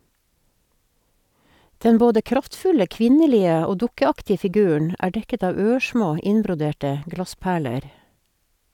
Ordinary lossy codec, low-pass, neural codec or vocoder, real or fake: none; 19.8 kHz; none; real